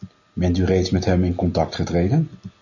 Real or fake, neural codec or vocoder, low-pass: real; none; 7.2 kHz